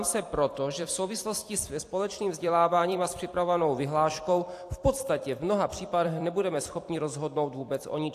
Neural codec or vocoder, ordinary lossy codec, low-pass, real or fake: vocoder, 44.1 kHz, 128 mel bands every 256 samples, BigVGAN v2; AAC, 64 kbps; 14.4 kHz; fake